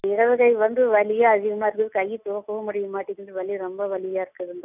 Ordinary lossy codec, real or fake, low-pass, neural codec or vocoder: none; real; 3.6 kHz; none